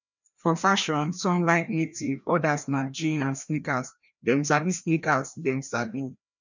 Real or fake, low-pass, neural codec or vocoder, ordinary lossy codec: fake; 7.2 kHz; codec, 16 kHz, 1 kbps, FreqCodec, larger model; none